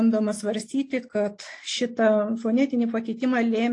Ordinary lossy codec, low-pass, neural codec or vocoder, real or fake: AAC, 48 kbps; 10.8 kHz; none; real